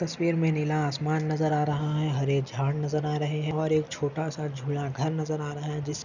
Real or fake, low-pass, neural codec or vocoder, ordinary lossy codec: real; 7.2 kHz; none; none